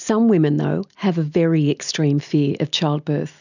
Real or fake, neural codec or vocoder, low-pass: real; none; 7.2 kHz